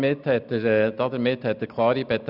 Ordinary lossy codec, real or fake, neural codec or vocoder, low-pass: none; real; none; 5.4 kHz